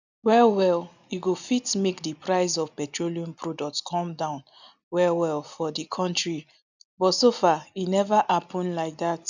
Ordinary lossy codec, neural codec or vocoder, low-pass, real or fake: none; none; 7.2 kHz; real